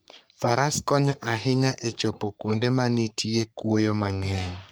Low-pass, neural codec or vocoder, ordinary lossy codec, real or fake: none; codec, 44.1 kHz, 3.4 kbps, Pupu-Codec; none; fake